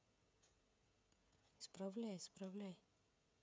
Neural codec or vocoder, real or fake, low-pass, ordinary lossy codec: codec, 16 kHz, 16 kbps, FreqCodec, smaller model; fake; none; none